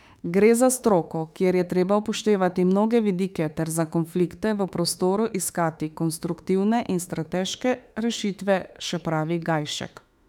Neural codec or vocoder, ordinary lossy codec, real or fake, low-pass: autoencoder, 48 kHz, 32 numbers a frame, DAC-VAE, trained on Japanese speech; none; fake; 19.8 kHz